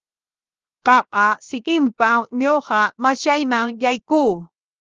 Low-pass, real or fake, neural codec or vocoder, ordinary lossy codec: 7.2 kHz; fake; codec, 16 kHz, 0.7 kbps, FocalCodec; Opus, 24 kbps